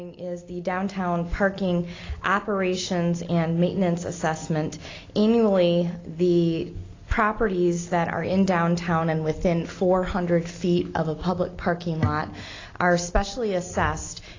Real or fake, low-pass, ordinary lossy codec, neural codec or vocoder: real; 7.2 kHz; AAC, 32 kbps; none